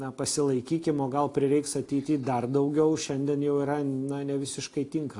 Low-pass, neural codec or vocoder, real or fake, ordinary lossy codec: 10.8 kHz; none; real; AAC, 48 kbps